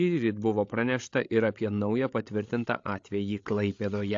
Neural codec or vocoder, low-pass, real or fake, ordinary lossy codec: codec, 16 kHz, 8 kbps, FreqCodec, larger model; 7.2 kHz; fake; AAC, 48 kbps